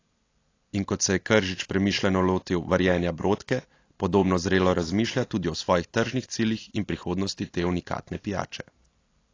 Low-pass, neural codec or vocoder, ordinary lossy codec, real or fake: 7.2 kHz; none; AAC, 32 kbps; real